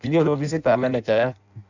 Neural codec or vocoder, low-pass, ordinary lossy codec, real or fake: codec, 16 kHz in and 24 kHz out, 0.6 kbps, FireRedTTS-2 codec; 7.2 kHz; none; fake